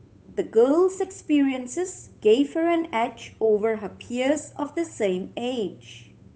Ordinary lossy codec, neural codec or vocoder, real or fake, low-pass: none; codec, 16 kHz, 8 kbps, FunCodec, trained on Chinese and English, 25 frames a second; fake; none